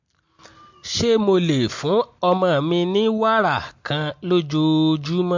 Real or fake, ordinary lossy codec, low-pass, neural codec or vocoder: real; MP3, 48 kbps; 7.2 kHz; none